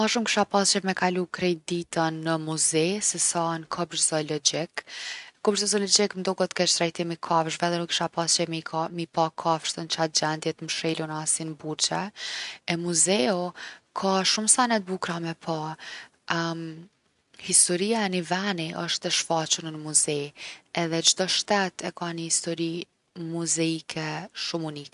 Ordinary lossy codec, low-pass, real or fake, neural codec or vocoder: none; 10.8 kHz; real; none